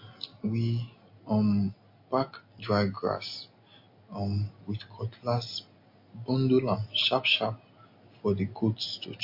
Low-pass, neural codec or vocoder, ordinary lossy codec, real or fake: 5.4 kHz; none; MP3, 32 kbps; real